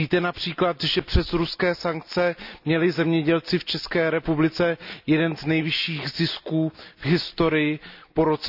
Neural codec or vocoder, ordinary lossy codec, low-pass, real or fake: none; none; 5.4 kHz; real